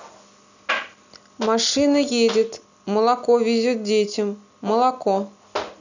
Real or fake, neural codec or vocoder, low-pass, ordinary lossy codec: real; none; 7.2 kHz; none